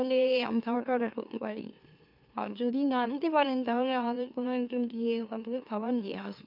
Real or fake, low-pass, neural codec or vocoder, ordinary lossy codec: fake; 5.4 kHz; autoencoder, 44.1 kHz, a latent of 192 numbers a frame, MeloTTS; none